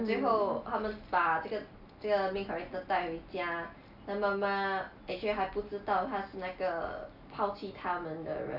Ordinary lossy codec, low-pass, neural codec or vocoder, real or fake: none; 5.4 kHz; none; real